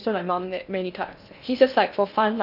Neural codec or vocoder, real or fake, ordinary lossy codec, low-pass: codec, 16 kHz in and 24 kHz out, 0.6 kbps, FocalCodec, streaming, 2048 codes; fake; none; 5.4 kHz